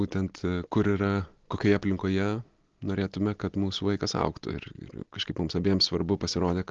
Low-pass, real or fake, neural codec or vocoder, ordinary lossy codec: 7.2 kHz; real; none; Opus, 16 kbps